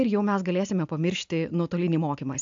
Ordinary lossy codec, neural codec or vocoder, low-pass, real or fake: AAC, 64 kbps; none; 7.2 kHz; real